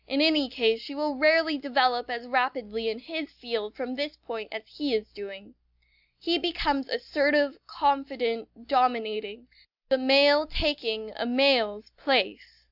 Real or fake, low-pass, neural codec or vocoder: real; 5.4 kHz; none